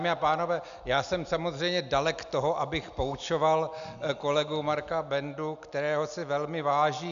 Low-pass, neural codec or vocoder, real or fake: 7.2 kHz; none; real